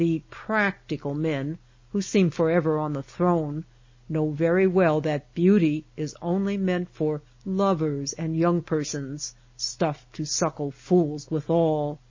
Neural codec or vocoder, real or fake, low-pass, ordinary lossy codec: none; real; 7.2 kHz; MP3, 32 kbps